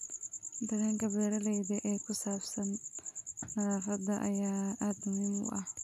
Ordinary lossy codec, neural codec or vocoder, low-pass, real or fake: none; none; 14.4 kHz; real